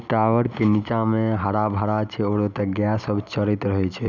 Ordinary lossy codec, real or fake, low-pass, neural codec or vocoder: none; real; 7.2 kHz; none